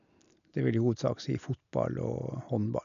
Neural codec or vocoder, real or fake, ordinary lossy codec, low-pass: none; real; none; 7.2 kHz